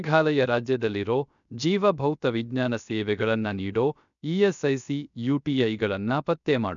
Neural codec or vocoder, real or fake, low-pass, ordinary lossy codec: codec, 16 kHz, 0.3 kbps, FocalCodec; fake; 7.2 kHz; none